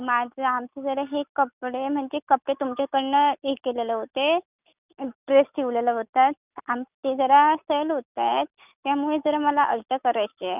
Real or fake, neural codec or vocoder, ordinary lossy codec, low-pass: real; none; none; 3.6 kHz